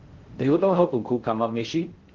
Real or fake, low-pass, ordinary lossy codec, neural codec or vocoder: fake; 7.2 kHz; Opus, 16 kbps; codec, 16 kHz in and 24 kHz out, 0.6 kbps, FocalCodec, streaming, 4096 codes